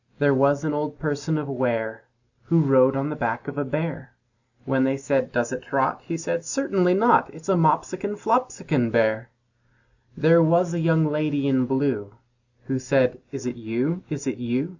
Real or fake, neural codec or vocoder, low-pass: real; none; 7.2 kHz